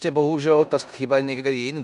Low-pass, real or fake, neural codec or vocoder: 10.8 kHz; fake; codec, 16 kHz in and 24 kHz out, 0.9 kbps, LongCat-Audio-Codec, four codebook decoder